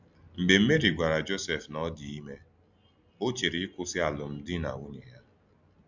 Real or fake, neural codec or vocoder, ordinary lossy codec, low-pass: real; none; none; 7.2 kHz